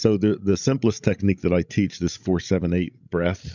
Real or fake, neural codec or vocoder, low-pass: fake; codec, 16 kHz, 16 kbps, FreqCodec, larger model; 7.2 kHz